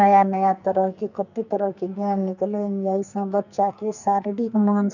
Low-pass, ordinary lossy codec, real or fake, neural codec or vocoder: 7.2 kHz; none; fake; codec, 44.1 kHz, 2.6 kbps, SNAC